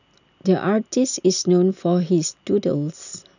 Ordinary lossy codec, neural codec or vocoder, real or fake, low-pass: none; none; real; 7.2 kHz